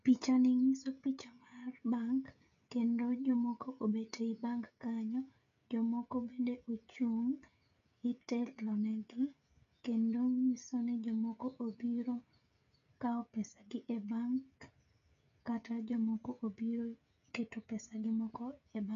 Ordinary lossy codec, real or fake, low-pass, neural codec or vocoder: MP3, 64 kbps; fake; 7.2 kHz; codec, 16 kHz, 16 kbps, FreqCodec, smaller model